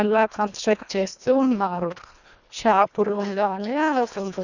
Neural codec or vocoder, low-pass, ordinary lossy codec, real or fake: codec, 24 kHz, 1.5 kbps, HILCodec; 7.2 kHz; none; fake